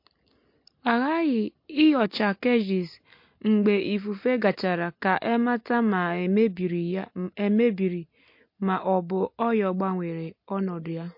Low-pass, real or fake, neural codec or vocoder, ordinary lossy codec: 5.4 kHz; real; none; MP3, 32 kbps